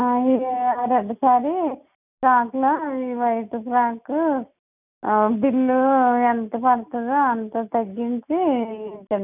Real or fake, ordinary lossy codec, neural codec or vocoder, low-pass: real; none; none; 3.6 kHz